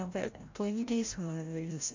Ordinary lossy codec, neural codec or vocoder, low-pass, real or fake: AAC, 48 kbps; codec, 16 kHz, 0.5 kbps, FreqCodec, larger model; 7.2 kHz; fake